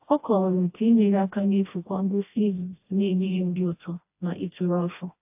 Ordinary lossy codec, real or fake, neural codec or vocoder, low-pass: AAC, 32 kbps; fake; codec, 16 kHz, 1 kbps, FreqCodec, smaller model; 3.6 kHz